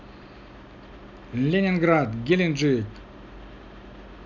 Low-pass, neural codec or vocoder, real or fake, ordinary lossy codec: 7.2 kHz; none; real; none